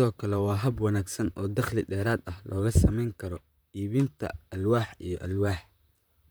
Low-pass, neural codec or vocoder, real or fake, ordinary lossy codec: none; vocoder, 44.1 kHz, 128 mel bands, Pupu-Vocoder; fake; none